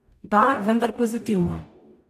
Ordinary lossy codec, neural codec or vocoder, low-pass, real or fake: none; codec, 44.1 kHz, 0.9 kbps, DAC; 14.4 kHz; fake